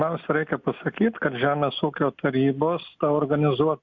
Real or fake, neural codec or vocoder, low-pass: real; none; 7.2 kHz